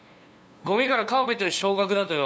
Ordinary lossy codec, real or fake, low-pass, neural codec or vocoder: none; fake; none; codec, 16 kHz, 2 kbps, FunCodec, trained on LibriTTS, 25 frames a second